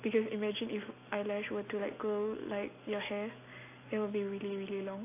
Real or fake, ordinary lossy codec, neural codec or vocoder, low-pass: real; AAC, 24 kbps; none; 3.6 kHz